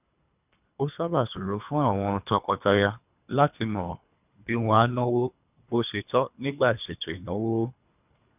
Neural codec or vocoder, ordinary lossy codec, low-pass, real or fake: codec, 24 kHz, 3 kbps, HILCodec; none; 3.6 kHz; fake